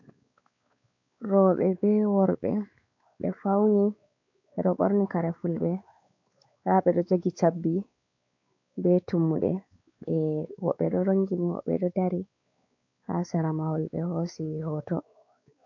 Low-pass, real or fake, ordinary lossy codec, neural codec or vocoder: 7.2 kHz; fake; AAC, 48 kbps; codec, 16 kHz, 4 kbps, X-Codec, WavLM features, trained on Multilingual LibriSpeech